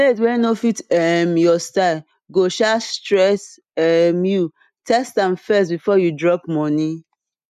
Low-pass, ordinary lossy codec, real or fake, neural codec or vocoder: 14.4 kHz; none; real; none